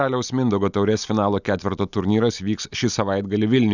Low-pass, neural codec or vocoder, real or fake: 7.2 kHz; none; real